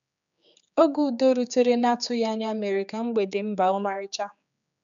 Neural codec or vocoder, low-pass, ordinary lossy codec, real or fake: codec, 16 kHz, 4 kbps, X-Codec, HuBERT features, trained on general audio; 7.2 kHz; none; fake